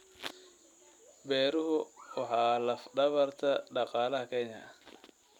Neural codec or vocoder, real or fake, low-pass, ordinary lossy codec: vocoder, 44.1 kHz, 128 mel bands every 256 samples, BigVGAN v2; fake; 19.8 kHz; none